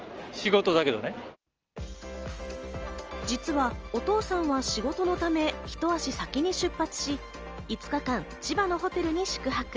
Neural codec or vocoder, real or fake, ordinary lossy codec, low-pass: none; real; Opus, 24 kbps; 7.2 kHz